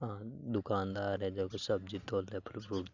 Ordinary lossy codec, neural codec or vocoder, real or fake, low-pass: Opus, 64 kbps; none; real; 7.2 kHz